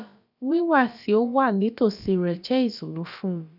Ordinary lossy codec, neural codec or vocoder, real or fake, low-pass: none; codec, 16 kHz, about 1 kbps, DyCAST, with the encoder's durations; fake; 5.4 kHz